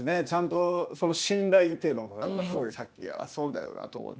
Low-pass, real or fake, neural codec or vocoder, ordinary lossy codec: none; fake; codec, 16 kHz, 0.8 kbps, ZipCodec; none